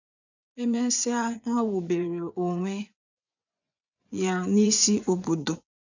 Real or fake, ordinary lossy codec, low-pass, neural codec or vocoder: fake; none; 7.2 kHz; vocoder, 24 kHz, 100 mel bands, Vocos